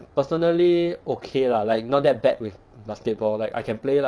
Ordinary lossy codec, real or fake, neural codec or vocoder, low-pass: none; fake; vocoder, 22.05 kHz, 80 mel bands, WaveNeXt; none